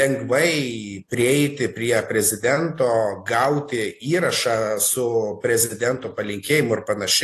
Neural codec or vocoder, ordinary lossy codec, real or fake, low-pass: none; AAC, 48 kbps; real; 14.4 kHz